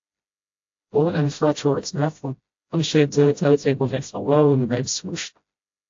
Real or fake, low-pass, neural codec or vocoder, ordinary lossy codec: fake; 7.2 kHz; codec, 16 kHz, 0.5 kbps, FreqCodec, smaller model; AAC, 48 kbps